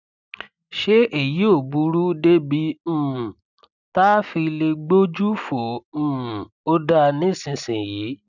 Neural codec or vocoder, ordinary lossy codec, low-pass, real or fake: none; none; 7.2 kHz; real